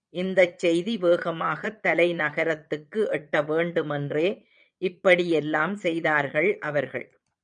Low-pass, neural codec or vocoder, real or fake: 9.9 kHz; vocoder, 22.05 kHz, 80 mel bands, Vocos; fake